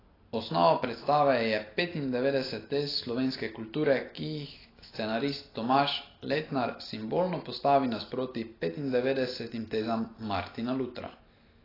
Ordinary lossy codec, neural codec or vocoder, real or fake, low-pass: AAC, 24 kbps; none; real; 5.4 kHz